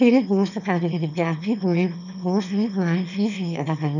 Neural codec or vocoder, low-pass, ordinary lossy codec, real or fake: autoencoder, 22.05 kHz, a latent of 192 numbers a frame, VITS, trained on one speaker; 7.2 kHz; none; fake